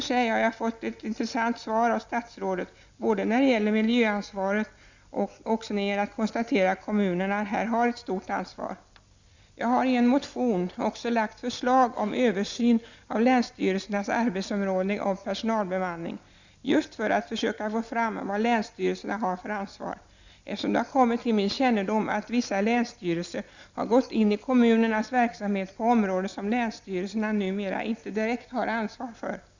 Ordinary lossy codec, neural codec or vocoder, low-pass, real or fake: Opus, 64 kbps; none; 7.2 kHz; real